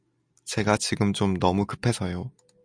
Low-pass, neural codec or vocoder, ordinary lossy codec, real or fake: 9.9 kHz; none; MP3, 96 kbps; real